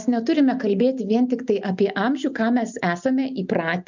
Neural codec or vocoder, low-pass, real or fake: none; 7.2 kHz; real